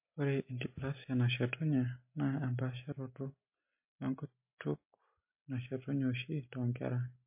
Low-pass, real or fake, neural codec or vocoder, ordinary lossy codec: 3.6 kHz; real; none; MP3, 24 kbps